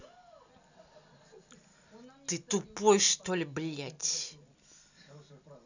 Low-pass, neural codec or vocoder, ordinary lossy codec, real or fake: 7.2 kHz; none; none; real